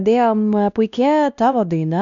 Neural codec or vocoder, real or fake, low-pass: codec, 16 kHz, 1 kbps, X-Codec, WavLM features, trained on Multilingual LibriSpeech; fake; 7.2 kHz